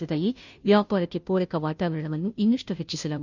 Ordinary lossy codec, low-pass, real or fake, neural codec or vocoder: none; 7.2 kHz; fake; codec, 16 kHz, 0.5 kbps, FunCodec, trained on Chinese and English, 25 frames a second